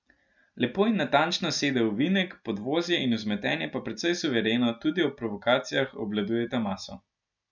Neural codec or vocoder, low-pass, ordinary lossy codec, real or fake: none; 7.2 kHz; none; real